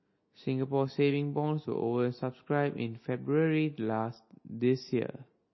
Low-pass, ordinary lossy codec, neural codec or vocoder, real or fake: 7.2 kHz; MP3, 24 kbps; none; real